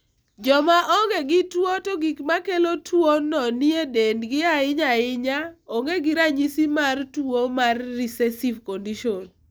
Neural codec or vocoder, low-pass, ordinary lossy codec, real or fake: none; none; none; real